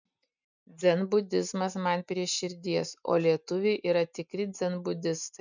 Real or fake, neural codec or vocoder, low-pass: real; none; 7.2 kHz